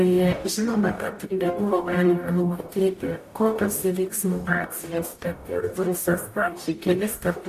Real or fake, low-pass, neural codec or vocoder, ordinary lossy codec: fake; 14.4 kHz; codec, 44.1 kHz, 0.9 kbps, DAC; AAC, 64 kbps